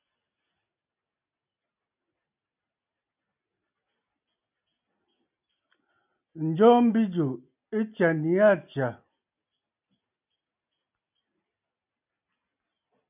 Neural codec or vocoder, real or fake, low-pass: none; real; 3.6 kHz